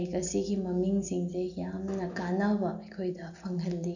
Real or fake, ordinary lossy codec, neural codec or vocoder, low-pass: real; none; none; 7.2 kHz